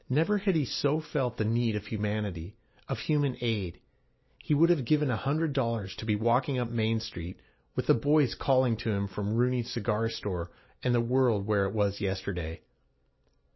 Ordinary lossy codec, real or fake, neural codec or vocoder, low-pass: MP3, 24 kbps; real; none; 7.2 kHz